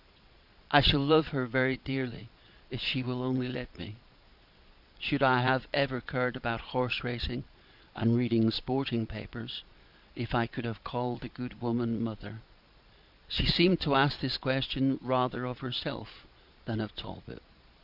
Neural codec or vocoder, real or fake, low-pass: vocoder, 22.05 kHz, 80 mel bands, Vocos; fake; 5.4 kHz